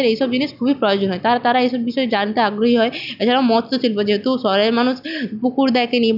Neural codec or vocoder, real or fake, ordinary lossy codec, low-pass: none; real; none; 5.4 kHz